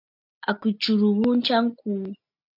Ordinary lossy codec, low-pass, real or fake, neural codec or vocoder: MP3, 48 kbps; 5.4 kHz; real; none